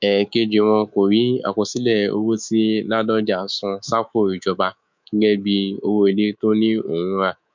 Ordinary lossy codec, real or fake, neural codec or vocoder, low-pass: MP3, 64 kbps; fake; codec, 24 kHz, 3.1 kbps, DualCodec; 7.2 kHz